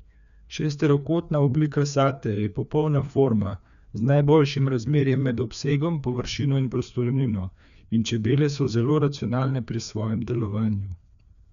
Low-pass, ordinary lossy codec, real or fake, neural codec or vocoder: 7.2 kHz; none; fake; codec, 16 kHz, 2 kbps, FreqCodec, larger model